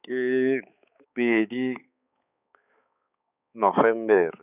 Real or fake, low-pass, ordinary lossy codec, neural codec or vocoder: fake; 3.6 kHz; none; codec, 16 kHz, 4 kbps, X-Codec, HuBERT features, trained on balanced general audio